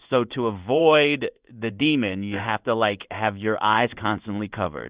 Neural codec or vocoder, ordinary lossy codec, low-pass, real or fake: codec, 16 kHz in and 24 kHz out, 1 kbps, XY-Tokenizer; Opus, 64 kbps; 3.6 kHz; fake